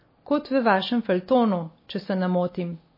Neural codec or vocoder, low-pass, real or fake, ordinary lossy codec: none; 5.4 kHz; real; MP3, 24 kbps